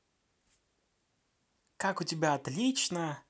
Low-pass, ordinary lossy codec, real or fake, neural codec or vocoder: none; none; real; none